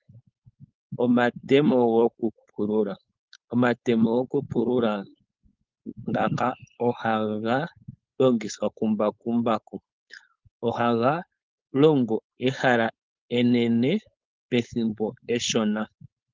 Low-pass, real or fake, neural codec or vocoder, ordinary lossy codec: 7.2 kHz; fake; codec, 16 kHz, 4.8 kbps, FACodec; Opus, 32 kbps